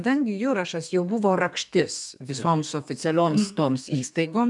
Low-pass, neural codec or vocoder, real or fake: 10.8 kHz; codec, 32 kHz, 1.9 kbps, SNAC; fake